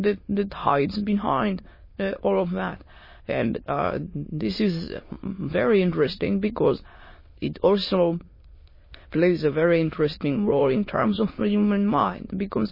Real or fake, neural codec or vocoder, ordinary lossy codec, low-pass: fake; autoencoder, 22.05 kHz, a latent of 192 numbers a frame, VITS, trained on many speakers; MP3, 24 kbps; 5.4 kHz